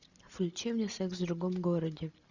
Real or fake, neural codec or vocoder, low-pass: real; none; 7.2 kHz